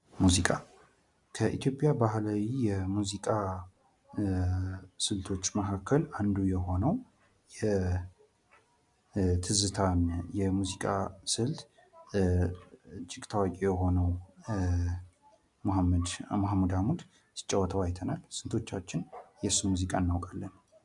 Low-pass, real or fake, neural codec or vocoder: 10.8 kHz; real; none